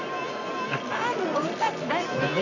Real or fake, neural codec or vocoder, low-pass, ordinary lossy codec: fake; codec, 24 kHz, 0.9 kbps, WavTokenizer, medium music audio release; 7.2 kHz; none